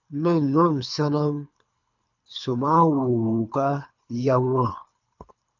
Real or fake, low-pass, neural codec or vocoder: fake; 7.2 kHz; codec, 24 kHz, 3 kbps, HILCodec